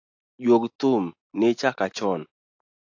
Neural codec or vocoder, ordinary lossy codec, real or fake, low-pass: none; AAC, 48 kbps; real; 7.2 kHz